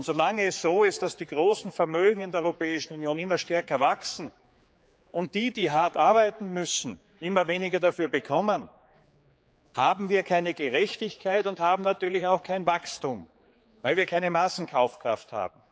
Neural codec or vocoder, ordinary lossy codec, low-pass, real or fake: codec, 16 kHz, 4 kbps, X-Codec, HuBERT features, trained on general audio; none; none; fake